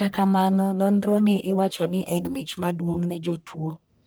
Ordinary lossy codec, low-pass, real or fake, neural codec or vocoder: none; none; fake; codec, 44.1 kHz, 1.7 kbps, Pupu-Codec